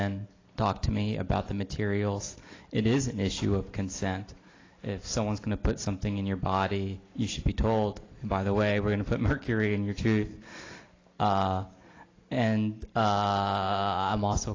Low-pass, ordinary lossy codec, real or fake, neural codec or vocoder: 7.2 kHz; AAC, 32 kbps; real; none